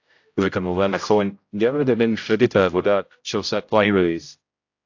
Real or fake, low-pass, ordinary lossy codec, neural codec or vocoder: fake; 7.2 kHz; AAC, 48 kbps; codec, 16 kHz, 0.5 kbps, X-Codec, HuBERT features, trained on general audio